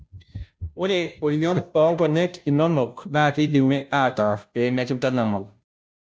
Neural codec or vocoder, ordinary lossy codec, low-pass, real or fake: codec, 16 kHz, 0.5 kbps, FunCodec, trained on Chinese and English, 25 frames a second; none; none; fake